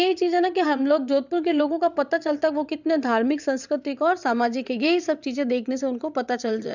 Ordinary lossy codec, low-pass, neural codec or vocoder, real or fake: none; 7.2 kHz; vocoder, 22.05 kHz, 80 mel bands, WaveNeXt; fake